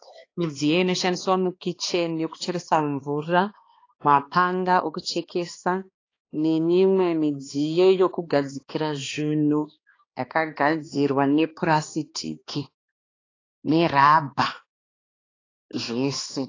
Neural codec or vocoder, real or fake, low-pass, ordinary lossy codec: codec, 16 kHz, 2 kbps, X-Codec, HuBERT features, trained on balanced general audio; fake; 7.2 kHz; AAC, 32 kbps